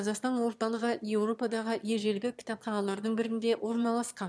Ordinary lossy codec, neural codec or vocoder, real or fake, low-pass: none; autoencoder, 22.05 kHz, a latent of 192 numbers a frame, VITS, trained on one speaker; fake; none